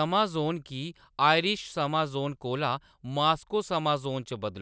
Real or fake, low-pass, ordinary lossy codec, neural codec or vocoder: real; none; none; none